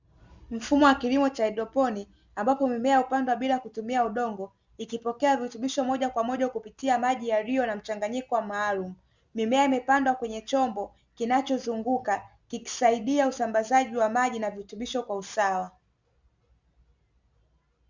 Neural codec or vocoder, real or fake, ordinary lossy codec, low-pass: none; real; Opus, 64 kbps; 7.2 kHz